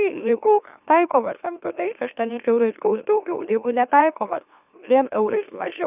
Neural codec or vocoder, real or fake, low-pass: autoencoder, 44.1 kHz, a latent of 192 numbers a frame, MeloTTS; fake; 3.6 kHz